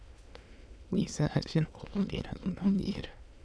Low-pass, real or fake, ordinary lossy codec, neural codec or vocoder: none; fake; none; autoencoder, 22.05 kHz, a latent of 192 numbers a frame, VITS, trained on many speakers